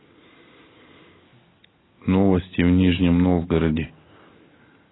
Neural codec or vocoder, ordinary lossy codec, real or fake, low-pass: none; AAC, 16 kbps; real; 7.2 kHz